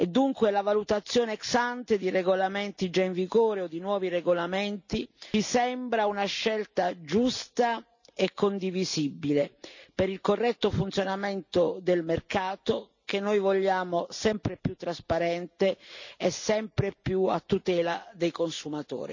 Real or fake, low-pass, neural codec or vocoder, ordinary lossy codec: real; 7.2 kHz; none; none